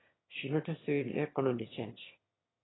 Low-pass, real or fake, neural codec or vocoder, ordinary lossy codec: 7.2 kHz; fake; autoencoder, 22.05 kHz, a latent of 192 numbers a frame, VITS, trained on one speaker; AAC, 16 kbps